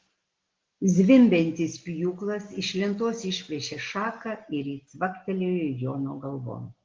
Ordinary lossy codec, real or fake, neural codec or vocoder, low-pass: Opus, 16 kbps; real; none; 7.2 kHz